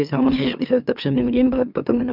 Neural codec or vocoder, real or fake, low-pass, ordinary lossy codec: autoencoder, 44.1 kHz, a latent of 192 numbers a frame, MeloTTS; fake; 5.4 kHz; none